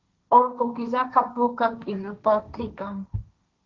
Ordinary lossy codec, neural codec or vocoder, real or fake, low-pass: Opus, 24 kbps; codec, 16 kHz, 1.1 kbps, Voila-Tokenizer; fake; 7.2 kHz